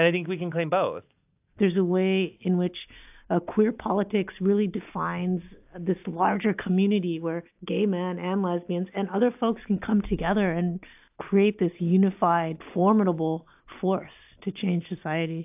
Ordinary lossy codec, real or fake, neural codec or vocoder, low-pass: AAC, 32 kbps; real; none; 3.6 kHz